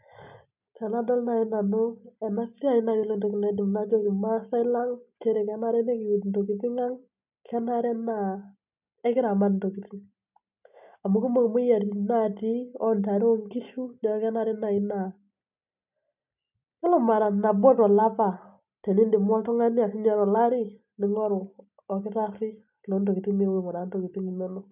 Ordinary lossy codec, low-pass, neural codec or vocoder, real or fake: none; 3.6 kHz; none; real